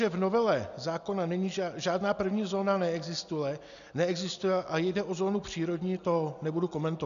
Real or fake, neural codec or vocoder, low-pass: real; none; 7.2 kHz